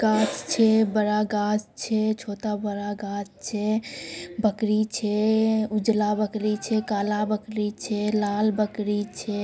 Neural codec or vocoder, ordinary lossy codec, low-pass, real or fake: none; none; none; real